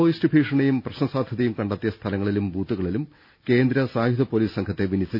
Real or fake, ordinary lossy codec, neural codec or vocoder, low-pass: real; none; none; 5.4 kHz